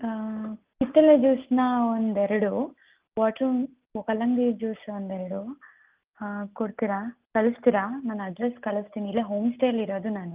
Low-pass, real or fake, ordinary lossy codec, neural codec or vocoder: 3.6 kHz; real; Opus, 32 kbps; none